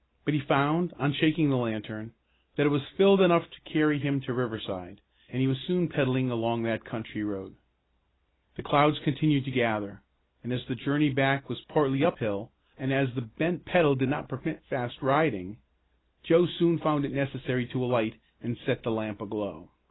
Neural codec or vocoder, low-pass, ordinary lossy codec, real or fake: none; 7.2 kHz; AAC, 16 kbps; real